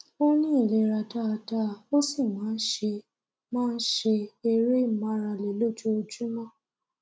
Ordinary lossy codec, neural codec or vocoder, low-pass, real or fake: none; none; none; real